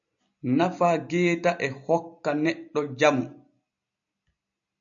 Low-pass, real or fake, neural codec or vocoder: 7.2 kHz; real; none